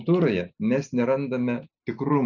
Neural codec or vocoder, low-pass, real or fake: none; 7.2 kHz; real